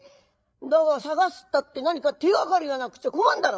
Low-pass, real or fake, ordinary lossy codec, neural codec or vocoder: none; fake; none; codec, 16 kHz, 8 kbps, FreqCodec, larger model